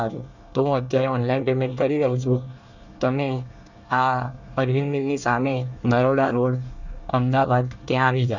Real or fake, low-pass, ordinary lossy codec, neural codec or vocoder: fake; 7.2 kHz; none; codec, 24 kHz, 1 kbps, SNAC